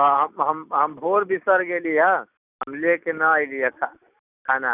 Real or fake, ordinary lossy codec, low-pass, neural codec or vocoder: real; none; 3.6 kHz; none